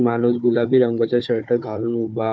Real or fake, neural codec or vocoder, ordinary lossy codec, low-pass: fake; codec, 16 kHz, 4 kbps, FunCodec, trained on Chinese and English, 50 frames a second; none; none